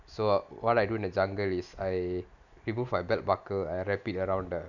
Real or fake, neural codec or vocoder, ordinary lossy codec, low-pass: real; none; none; 7.2 kHz